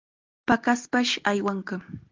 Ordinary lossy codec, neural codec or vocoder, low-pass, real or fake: Opus, 32 kbps; none; 7.2 kHz; real